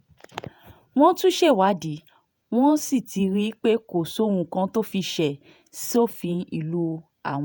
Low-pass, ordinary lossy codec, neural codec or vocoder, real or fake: none; none; vocoder, 48 kHz, 128 mel bands, Vocos; fake